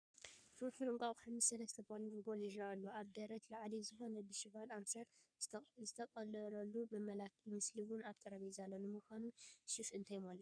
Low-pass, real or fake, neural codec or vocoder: 9.9 kHz; fake; codec, 44.1 kHz, 3.4 kbps, Pupu-Codec